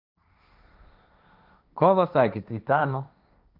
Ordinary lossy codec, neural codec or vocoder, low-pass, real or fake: none; codec, 16 kHz, 1.1 kbps, Voila-Tokenizer; 5.4 kHz; fake